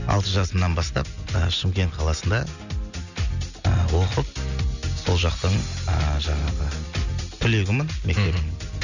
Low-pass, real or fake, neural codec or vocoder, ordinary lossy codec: 7.2 kHz; real; none; none